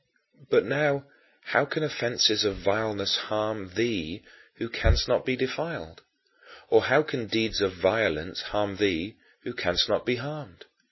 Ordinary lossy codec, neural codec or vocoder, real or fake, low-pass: MP3, 24 kbps; none; real; 7.2 kHz